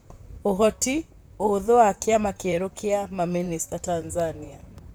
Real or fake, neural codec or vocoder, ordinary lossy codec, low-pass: fake; vocoder, 44.1 kHz, 128 mel bands, Pupu-Vocoder; none; none